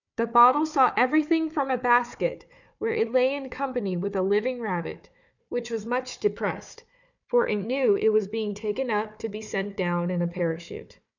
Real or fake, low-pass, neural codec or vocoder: fake; 7.2 kHz; codec, 16 kHz, 4 kbps, FunCodec, trained on Chinese and English, 50 frames a second